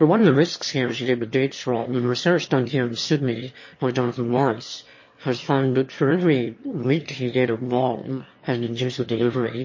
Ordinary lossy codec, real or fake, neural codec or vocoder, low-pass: MP3, 32 kbps; fake; autoencoder, 22.05 kHz, a latent of 192 numbers a frame, VITS, trained on one speaker; 7.2 kHz